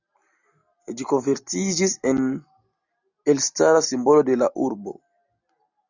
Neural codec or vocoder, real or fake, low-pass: none; real; 7.2 kHz